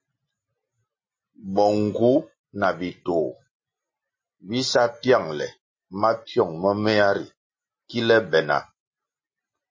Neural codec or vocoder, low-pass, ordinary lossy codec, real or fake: none; 7.2 kHz; MP3, 32 kbps; real